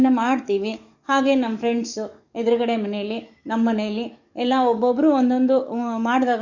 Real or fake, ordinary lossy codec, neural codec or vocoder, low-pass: fake; none; codec, 44.1 kHz, 7.8 kbps, DAC; 7.2 kHz